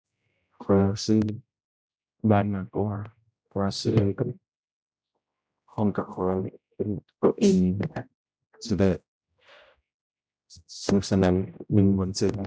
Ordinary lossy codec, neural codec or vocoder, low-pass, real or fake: none; codec, 16 kHz, 0.5 kbps, X-Codec, HuBERT features, trained on general audio; none; fake